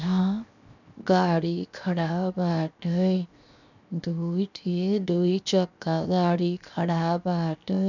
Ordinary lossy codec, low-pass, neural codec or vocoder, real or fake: none; 7.2 kHz; codec, 16 kHz, 0.7 kbps, FocalCodec; fake